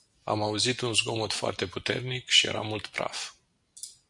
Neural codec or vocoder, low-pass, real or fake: none; 10.8 kHz; real